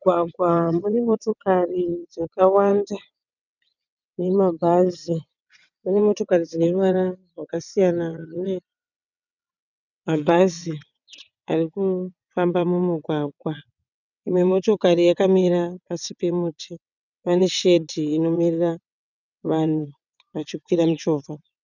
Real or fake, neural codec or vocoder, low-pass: fake; vocoder, 22.05 kHz, 80 mel bands, WaveNeXt; 7.2 kHz